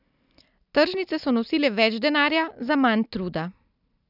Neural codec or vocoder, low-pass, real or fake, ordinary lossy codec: none; 5.4 kHz; real; none